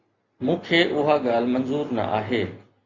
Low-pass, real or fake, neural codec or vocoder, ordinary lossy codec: 7.2 kHz; real; none; Opus, 64 kbps